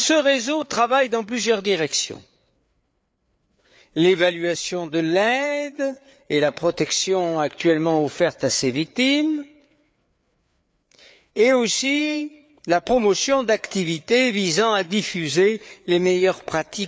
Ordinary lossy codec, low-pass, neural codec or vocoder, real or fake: none; none; codec, 16 kHz, 4 kbps, FreqCodec, larger model; fake